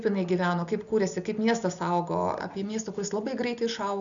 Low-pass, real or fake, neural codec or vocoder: 7.2 kHz; real; none